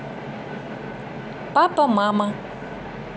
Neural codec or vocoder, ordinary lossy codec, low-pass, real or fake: none; none; none; real